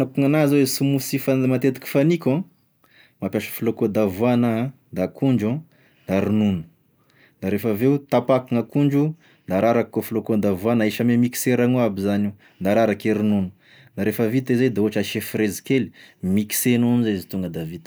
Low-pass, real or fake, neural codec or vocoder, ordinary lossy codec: none; real; none; none